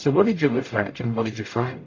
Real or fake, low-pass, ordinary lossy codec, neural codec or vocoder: fake; 7.2 kHz; AAC, 32 kbps; codec, 44.1 kHz, 0.9 kbps, DAC